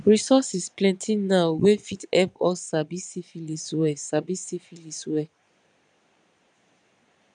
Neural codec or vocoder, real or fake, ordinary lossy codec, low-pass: vocoder, 22.05 kHz, 80 mel bands, Vocos; fake; none; 9.9 kHz